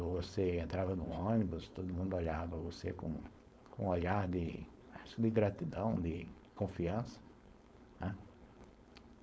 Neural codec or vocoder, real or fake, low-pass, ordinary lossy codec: codec, 16 kHz, 4.8 kbps, FACodec; fake; none; none